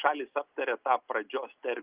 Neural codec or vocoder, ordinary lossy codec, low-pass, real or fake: none; Opus, 24 kbps; 3.6 kHz; real